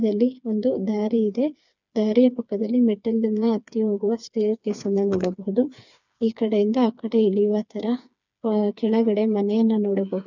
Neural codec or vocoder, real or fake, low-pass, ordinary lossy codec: codec, 16 kHz, 4 kbps, FreqCodec, smaller model; fake; 7.2 kHz; none